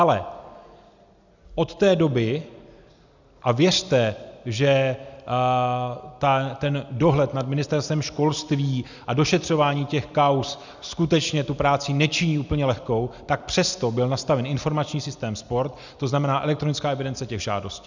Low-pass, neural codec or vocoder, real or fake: 7.2 kHz; none; real